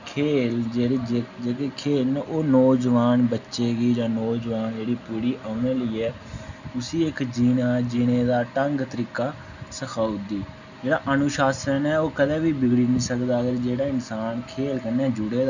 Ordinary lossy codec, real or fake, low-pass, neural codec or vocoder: none; real; 7.2 kHz; none